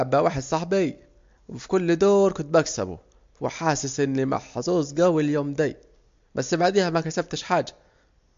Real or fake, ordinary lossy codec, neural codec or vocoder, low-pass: real; MP3, 48 kbps; none; 7.2 kHz